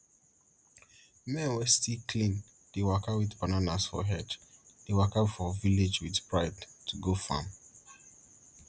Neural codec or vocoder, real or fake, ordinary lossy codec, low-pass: none; real; none; none